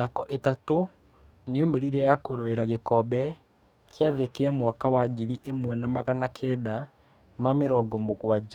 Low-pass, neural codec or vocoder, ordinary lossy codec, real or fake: 19.8 kHz; codec, 44.1 kHz, 2.6 kbps, DAC; none; fake